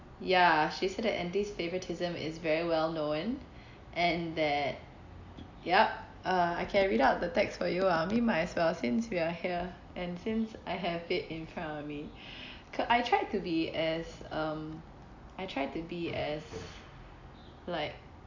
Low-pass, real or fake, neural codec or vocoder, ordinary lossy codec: 7.2 kHz; real; none; AAC, 48 kbps